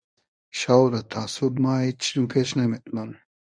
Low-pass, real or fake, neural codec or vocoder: 9.9 kHz; fake; codec, 24 kHz, 0.9 kbps, WavTokenizer, medium speech release version 1